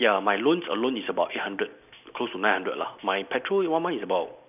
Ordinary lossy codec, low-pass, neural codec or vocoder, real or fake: none; 3.6 kHz; none; real